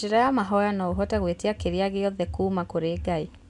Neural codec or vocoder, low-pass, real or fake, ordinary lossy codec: none; 10.8 kHz; real; none